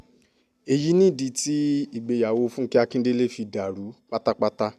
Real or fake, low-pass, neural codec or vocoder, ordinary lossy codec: real; 14.4 kHz; none; none